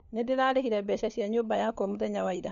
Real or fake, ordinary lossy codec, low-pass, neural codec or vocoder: fake; none; 7.2 kHz; codec, 16 kHz, 4 kbps, FunCodec, trained on LibriTTS, 50 frames a second